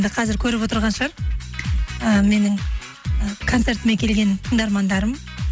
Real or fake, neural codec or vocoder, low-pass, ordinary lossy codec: real; none; none; none